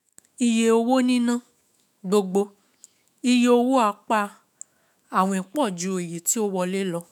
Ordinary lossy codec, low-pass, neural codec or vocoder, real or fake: none; 19.8 kHz; autoencoder, 48 kHz, 128 numbers a frame, DAC-VAE, trained on Japanese speech; fake